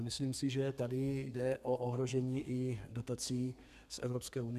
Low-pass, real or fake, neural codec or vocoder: 14.4 kHz; fake; codec, 32 kHz, 1.9 kbps, SNAC